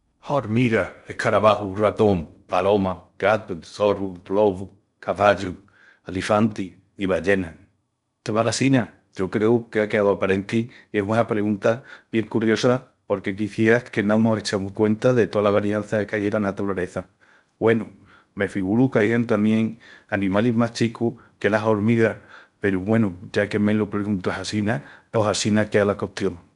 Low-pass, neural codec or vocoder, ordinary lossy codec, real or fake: 10.8 kHz; codec, 16 kHz in and 24 kHz out, 0.6 kbps, FocalCodec, streaming, 2048 codes; none; fake